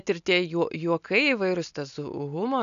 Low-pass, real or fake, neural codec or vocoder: 7.2 kHz; real; none